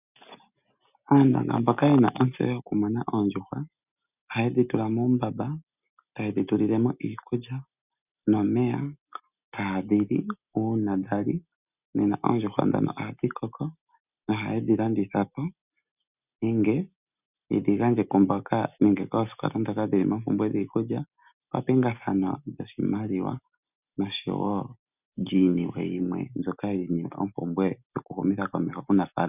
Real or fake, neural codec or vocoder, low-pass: real; none; 3.6 kHz